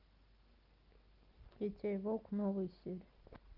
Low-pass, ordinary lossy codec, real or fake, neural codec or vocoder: 5.4 kHz; Opus, 32 kbps; real; none